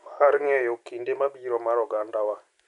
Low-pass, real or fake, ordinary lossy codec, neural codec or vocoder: 10.8 kHz; real; none; none